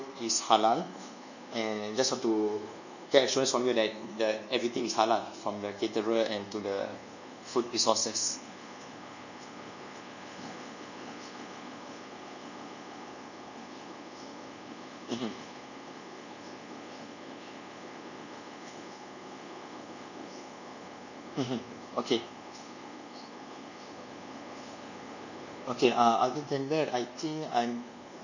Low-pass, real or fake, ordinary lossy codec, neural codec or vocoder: 7.2 kHz; fake; none; codec, 24 kHz, 1.2 kbps, DualCodec